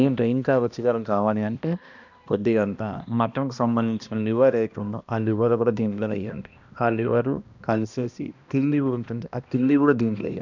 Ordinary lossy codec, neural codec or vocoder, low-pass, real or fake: none; codec, 16 kHz, 1 kbps, X-Codec, HuBERT features, trained on balanced general audio; 7.2 kHz; fake